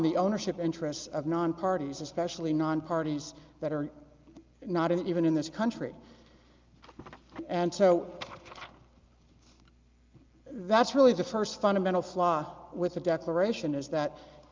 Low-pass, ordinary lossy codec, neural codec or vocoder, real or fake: 7.2 kHz; Opus, 24 kbps; none; real